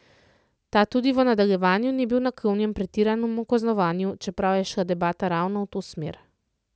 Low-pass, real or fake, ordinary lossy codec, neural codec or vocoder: none; real; none; none